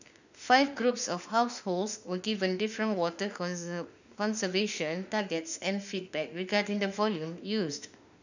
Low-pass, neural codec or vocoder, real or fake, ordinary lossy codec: 7.2 kHz; autoencoder, 48 kHz, 32 numbers a frame, DAC-VAE, trained on Japanese speech; fake; none